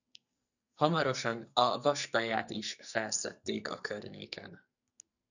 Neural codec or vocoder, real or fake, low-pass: codec, 44.1 kHz, 2.6 kbps, SNAC; fake; 7.2 kHz